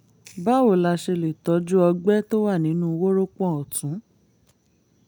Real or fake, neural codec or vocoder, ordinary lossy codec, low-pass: real; none; none; none